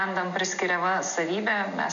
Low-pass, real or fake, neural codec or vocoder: 7.2 kHz; real; none